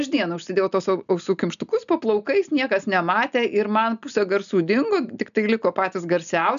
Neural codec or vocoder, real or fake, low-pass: none; real; 7.2 kHz